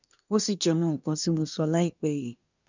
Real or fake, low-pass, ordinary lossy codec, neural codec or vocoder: fake; 7.2 kHz; none; codec, 24 kHz, 1 kbps, SNAC